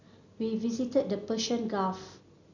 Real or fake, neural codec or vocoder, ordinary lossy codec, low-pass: real; none; none; 7.2 kHz